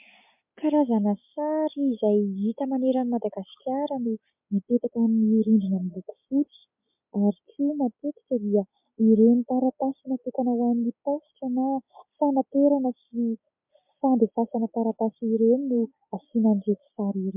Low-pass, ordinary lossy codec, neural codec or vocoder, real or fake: 3.6 kHz; MP3, 32 kbps; none; real